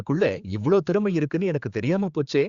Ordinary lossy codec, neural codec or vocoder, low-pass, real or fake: Opus, 64 kbps; codec, 16 kHz, 4 kbps, X-Codec, HuBERT features, trained on general audio; 7.2 kHz; fake